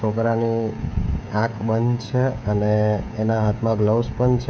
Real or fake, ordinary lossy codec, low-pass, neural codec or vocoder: fake; none; none; codec, 16 kHz, 16 kbps, FreqCodec, smaller model